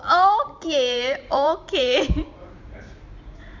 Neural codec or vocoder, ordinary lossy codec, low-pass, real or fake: vocoder, 44.1 kHz, 128 mel bands every 512 samples, BigVGAN v2; MP3, 64 kbps; 7.2 kHz; fake